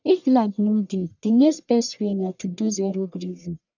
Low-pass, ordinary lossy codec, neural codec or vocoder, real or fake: 7.2 kHz; none; codec, 44.1 kHz, 1.7 kbps, Pupu-Codec; fake